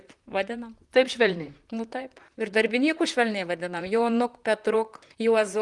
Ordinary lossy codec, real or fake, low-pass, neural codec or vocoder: Opus, 24 kbps; fake; 10.8 kHz; vocoder, 44.1 kHz, 128 mel bands, Pupu-Vocoder